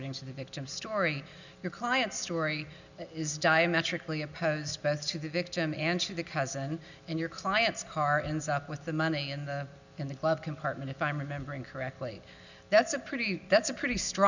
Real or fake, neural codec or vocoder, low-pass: real; none; 7.2 kHz